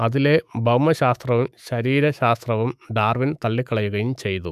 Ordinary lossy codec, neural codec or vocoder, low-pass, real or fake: none; autoencoder, 48 kHz, 128 numbers a frame, DAC-VAE, trained on Japanese speech; 14.4 kHz; fake